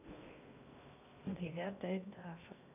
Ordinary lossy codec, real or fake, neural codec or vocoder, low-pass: none; fake; codec, 16 kHz in and 24 kHz out, 0.6 kbps, FocalCodec, streaming, 2048 codes; 3.6 kHz